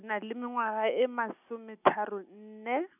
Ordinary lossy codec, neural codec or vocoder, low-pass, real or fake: none; none; 3.6 kHz; real